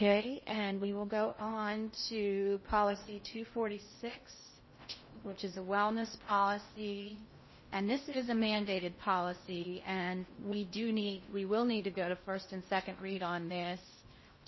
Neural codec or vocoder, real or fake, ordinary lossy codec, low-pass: codec, 16 kHz in and 24 kHz out, 0.6 kbps, FocalCodec, streaming, 2048 codes; fake; MP3, 24 kbps; 7.2 kHz